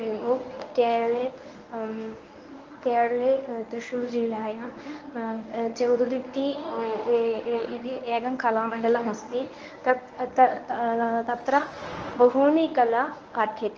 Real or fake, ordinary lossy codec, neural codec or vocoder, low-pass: fake; Opus, 24 kbps; codec, 24 kHz, 0.9 kbps, WavTokenizer, medium speech release version 1; 7.2 kHz